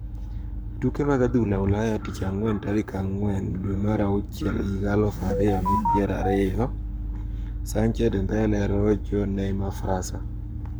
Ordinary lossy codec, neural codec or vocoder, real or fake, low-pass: none; codec, 44.1 kHz, 7.8 kbps, Pupu-Codec; fake; none